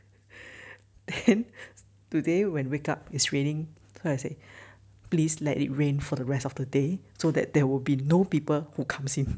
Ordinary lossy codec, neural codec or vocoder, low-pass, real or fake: none; none; none; real